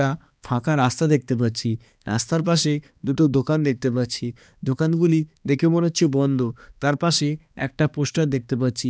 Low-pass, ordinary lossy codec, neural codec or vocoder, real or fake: none; none; codec, 16 kHz, 2 kbps, X-Codec, HuBERT features, trained on balanced general audio; fake